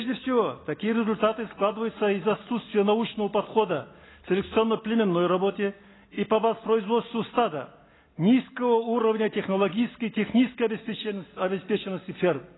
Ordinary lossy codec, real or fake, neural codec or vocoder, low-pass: AAC, 16 kbps; real; none; 7.2 kHz